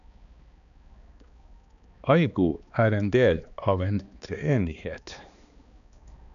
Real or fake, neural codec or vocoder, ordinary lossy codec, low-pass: fake; codec, 16 kHz, 2 kbps, X-Codec, HuBERT features, trained on balanced general audio; none; 7.2 kHz